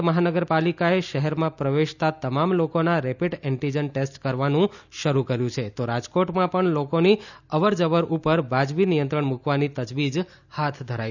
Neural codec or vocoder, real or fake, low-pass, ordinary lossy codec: none; real; 7.2 kHz; none